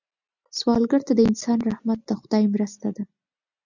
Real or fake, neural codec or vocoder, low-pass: real; none; 7.2 kHz